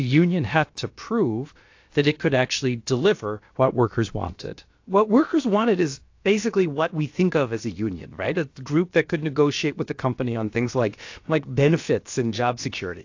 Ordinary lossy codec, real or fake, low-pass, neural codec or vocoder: AAC, 48 kbps; fake; 7.2 kHz; codec, 16 kHz, about 1 kbps, DyCAST, with the encoder's durations